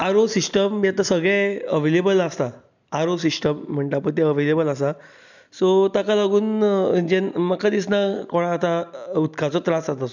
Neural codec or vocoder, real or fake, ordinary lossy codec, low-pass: none; real; none; 7.2 kHz